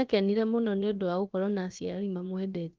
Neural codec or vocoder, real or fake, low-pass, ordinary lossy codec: codec, 16 kHz, about 1 kbps, DyCAST, with the encoder's durations; fake; 7.2 kHz; Opus, 24 kbps